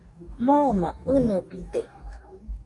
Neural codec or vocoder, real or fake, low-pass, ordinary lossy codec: codec, 44.1 kHz, 2.6 kbps, DAC; fake; 10.8 kHz; MP3, 64 kbps